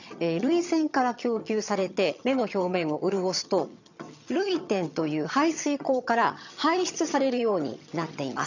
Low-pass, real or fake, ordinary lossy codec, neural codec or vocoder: 7.2 kHz; fake; none; vocoder, 22.05 kHz, 80 mel bands, HiFi-GAN